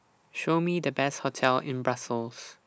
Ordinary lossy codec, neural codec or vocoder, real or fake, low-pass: none; none; real; none